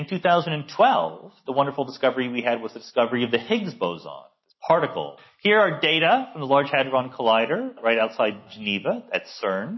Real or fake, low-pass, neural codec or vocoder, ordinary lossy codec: real; 7.2 kHz; none; MP3, 24 kbps